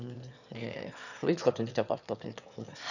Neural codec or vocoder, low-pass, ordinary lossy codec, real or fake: autoencoder, 22.05 kHz, a latent of 192 numbers a frame, VITS, trained on one speaker; 7.2 kHz; none; fake